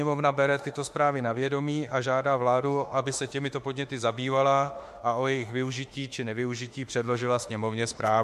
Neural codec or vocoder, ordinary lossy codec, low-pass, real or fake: autoencoder, 48 kHz, 32 numbers a frame, DAC-VAE, trained on Japanese speech; MP3, 64 kbps; 14.4 kHz; fake